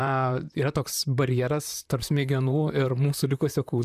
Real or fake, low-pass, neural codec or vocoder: fake; 14.4 kHz; vocoder, 44.1 kHz, 128 mel bands, Pupu-Vocoder